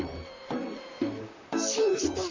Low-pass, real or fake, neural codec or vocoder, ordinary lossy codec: 7.2 kHz; fake; vocoder, 44.1 kHz, 128 mel bands, Pupu-Vocoder; AAC, 48 kbps